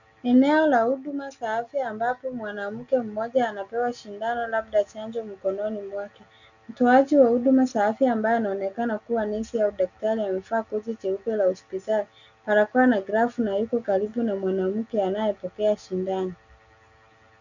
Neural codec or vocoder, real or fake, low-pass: none; real; 7.2 kHz